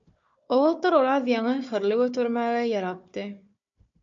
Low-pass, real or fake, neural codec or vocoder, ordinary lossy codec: 7.2 kHz; fake; codec, 16 kHz, 4 kbps, FunCodec, trained on Chinese and English, 50 frames a second; MP3, 48 kbps